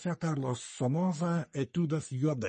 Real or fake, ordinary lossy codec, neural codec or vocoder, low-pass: fake; MP3, 32 kbps; codec, 44.1 kHz, 3.4 kbps, Pupu-Codec; 10.8 kHz